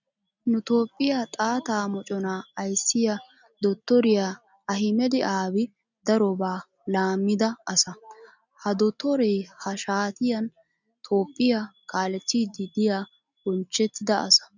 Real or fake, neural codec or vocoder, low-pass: real; none; 7.2 kHz